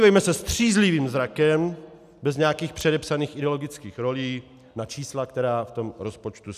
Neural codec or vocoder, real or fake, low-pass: none; real; 14.4 kHz